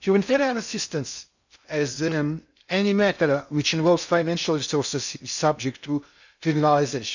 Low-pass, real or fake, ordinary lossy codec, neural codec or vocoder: 7.2 kHz; fake; none; codec, 16 kHz in and 24 kHz out, 0.6 kbps, FocalCodec, streaming, 2048 codes